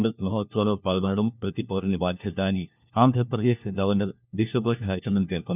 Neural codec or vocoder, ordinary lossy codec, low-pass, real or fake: codec, 16 kHz, 1 kbps, FunCodec, trained on LibriTTS, 50 frames a second; none; 3.6 kHz; fake